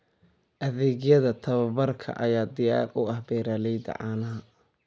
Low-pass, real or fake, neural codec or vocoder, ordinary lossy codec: none; real; none; none